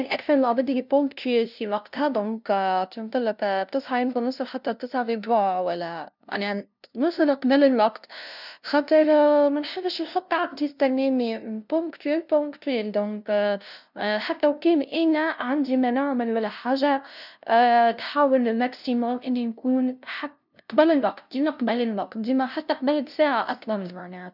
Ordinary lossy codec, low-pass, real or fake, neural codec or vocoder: none; 5.4 kHz; fake; codec, 16 kHz, 0.5 kbps, FunCodec, trained on LibriTTS, 25 frames a second